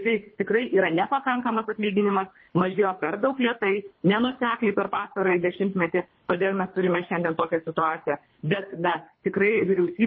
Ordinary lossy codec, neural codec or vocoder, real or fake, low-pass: MP3, 24 kbps; codec, 24 kHz, 3 kbps, HILCodec; fake; 7.2 kHz